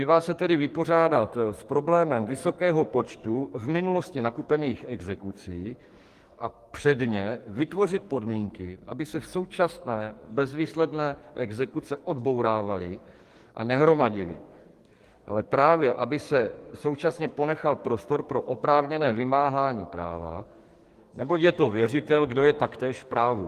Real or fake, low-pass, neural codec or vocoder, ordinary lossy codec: fake; 14.4 kHz; codec, 44.1 kHz, 2.6 kbps, SNAC; Opus, 24 kbps